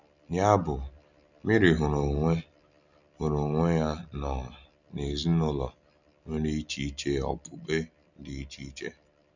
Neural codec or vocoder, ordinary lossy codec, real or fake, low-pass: none; none; real; 7.2 kHz